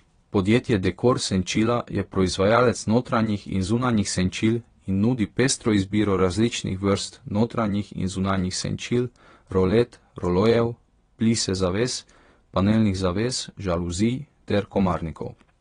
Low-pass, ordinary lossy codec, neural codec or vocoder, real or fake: 9.9 kHz; AAC, 32 kbps; vocoder, 22.05 kHz, 80 mel bands, WaveNeXt; fake